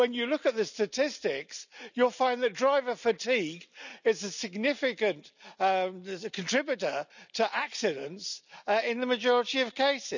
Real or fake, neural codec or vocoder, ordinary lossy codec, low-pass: real; none; none; 7.2 kHz